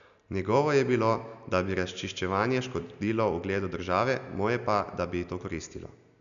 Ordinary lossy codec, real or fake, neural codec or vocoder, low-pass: none; real; none; 7.2 kHz